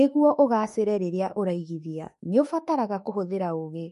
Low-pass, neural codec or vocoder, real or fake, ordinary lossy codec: 14.4 kHz; autoencoder, 48 kHz, 32 numbers a frame, DAC-VAE, trained on Japanese speech; fake; MP3, 48 kbps